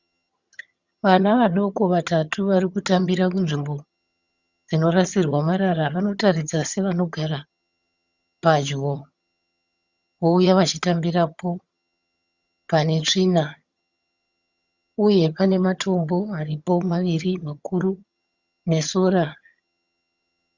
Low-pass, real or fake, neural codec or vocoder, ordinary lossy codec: 7.2 kHz; fake; vocoder, 22.05 kHz, 80 mel bands, HiFi-GAN; Opus, 64 kbps